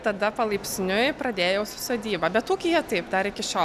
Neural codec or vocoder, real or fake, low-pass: none; real; 14.4 kHz